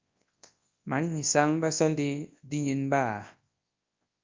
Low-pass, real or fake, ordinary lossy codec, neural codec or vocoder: 7.2 kHz; fake; Opus, 24 kbps; codec, 24 kHz, 0.9 kbps, WavTokenizer, large speech release